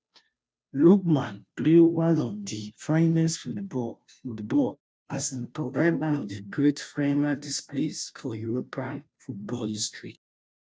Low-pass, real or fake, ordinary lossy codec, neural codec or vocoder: none; fake; none; codec, 16 kHz, 0.5 kbps, FunCodec, trained on Chinese and English, 25 frames a second